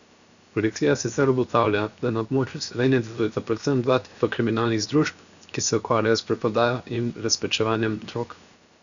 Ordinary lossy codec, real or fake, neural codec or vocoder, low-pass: none; fake; codec, 16 kHz, 0.7 kbps, FocalCodec; 7.2 kHz